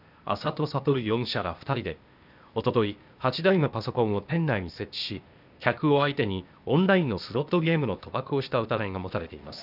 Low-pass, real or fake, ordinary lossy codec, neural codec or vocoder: 5.4 kHz; fake; none; codec, 16 kHz, 0.8 kbps, ZipCodec